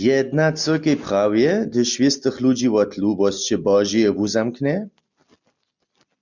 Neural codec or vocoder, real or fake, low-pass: none; real; 7.2 kHz